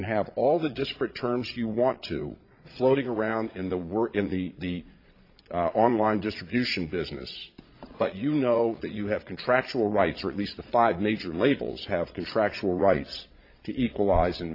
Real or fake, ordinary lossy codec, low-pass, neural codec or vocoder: fake; AAC, 32 kbps; 5.4 kHz; vocoder, 22.05 kHz, 80 mel bands, Vocos